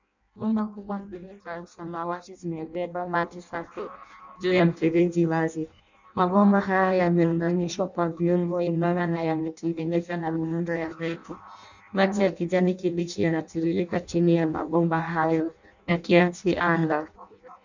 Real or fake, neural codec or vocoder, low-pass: fake; codec, 16 kHz in and 24 kHz out, 0.6 kbps, FireRedTTS-2 codec; 7.2 kHz